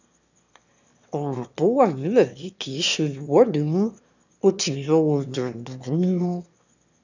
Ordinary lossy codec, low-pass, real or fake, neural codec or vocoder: none; 7.2 kHz; fake; autoencoder, 22.05 kHz, a latent of 192 numbers a frame, VITS, trained on one speaker